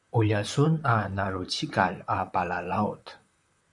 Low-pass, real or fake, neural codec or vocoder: 10.8 kHz; fake; vocoder, 44.1 kHz, 128 mel bands, Pupu-Vocoder